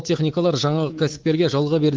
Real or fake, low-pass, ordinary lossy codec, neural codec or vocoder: fake; 7.2 kHz; Opus, 16 kbps; codec, 24 kHz, 3.1 kbps, DualCodec